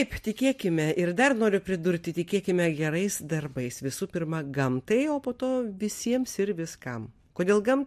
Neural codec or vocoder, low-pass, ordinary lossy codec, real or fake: none; 14.4 kHz; MP3, 64 kbps; real